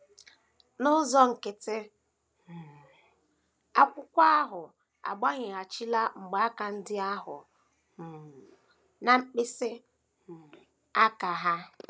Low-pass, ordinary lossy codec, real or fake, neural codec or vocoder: none; none; real; none